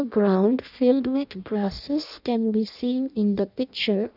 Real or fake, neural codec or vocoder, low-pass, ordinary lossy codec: fake; codec, 16 kHz in and 24 kHz out, 0.6 kbps, FireRedTTS-2 codec; 5.4 kHz; none